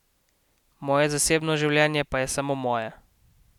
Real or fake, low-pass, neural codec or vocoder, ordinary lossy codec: real; 19.8 kHz; none; none